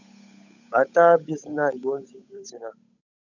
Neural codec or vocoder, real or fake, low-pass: codec, 16 kHz, 8 kbps, FunCodec, trained on Chinese and English, 25 frames a second; fake; 7.2 kHz